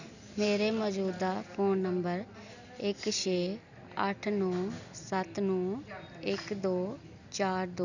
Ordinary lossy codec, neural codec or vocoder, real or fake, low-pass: none; none; real; 7.2 kHz